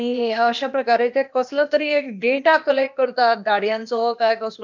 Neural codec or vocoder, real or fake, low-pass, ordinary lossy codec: codec, 16 kHz, 0.8 kbps, ZipCodec; fake; 7.2 kHz; MP3, 64 kbps